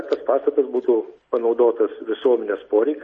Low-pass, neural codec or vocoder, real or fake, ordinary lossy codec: 10.8 kHz; none; real; MP3, 32 kbps